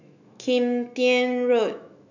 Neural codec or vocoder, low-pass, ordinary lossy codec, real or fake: autoencoder, 48 kHz, 128 numbers a frame, DAC-VAE, trained on Japanese speech; 7.2 kHz; none; fake